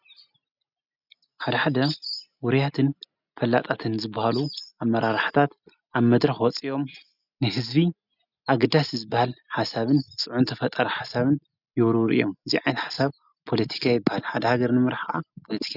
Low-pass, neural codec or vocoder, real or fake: 5.4 kHz; none; real